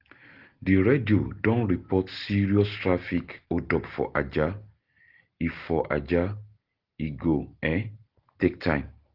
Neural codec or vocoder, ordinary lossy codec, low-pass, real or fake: none; Opus, 16 kbps; 5.4 kHz; real